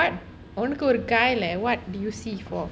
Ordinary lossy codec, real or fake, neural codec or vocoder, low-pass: none; real; none; none